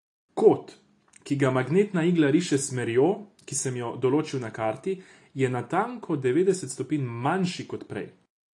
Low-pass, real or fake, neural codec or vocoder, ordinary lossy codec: 10.8 kHz; real; none; AAC, 48 kbps